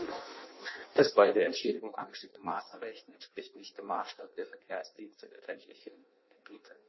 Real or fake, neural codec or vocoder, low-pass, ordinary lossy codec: fake; codec, 16 kHz in and 24 kHz out, 0.6 kbps, FireRedTTS-2 codec; 7.2 kHz; MP3, 24 kbps